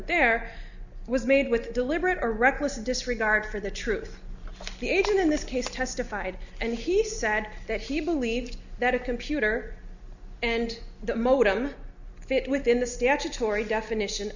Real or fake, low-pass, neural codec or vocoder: real; 7.2 kHz; none